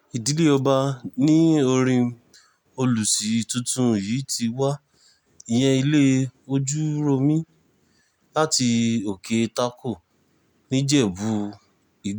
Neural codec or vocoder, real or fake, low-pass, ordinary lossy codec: none; real; none; none